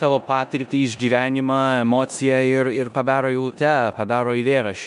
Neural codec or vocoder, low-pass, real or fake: codec, 16 kHz in and 24 kHz out, 0.9 kbps, LongCat-Audio-Codec, four codebook decoder; 10.8 kHz; fake